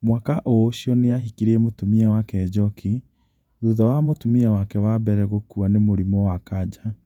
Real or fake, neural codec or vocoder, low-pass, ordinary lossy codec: fake; vocoder, 48 kHz, 128 mel bands, Vocos; 19.8 kHz; none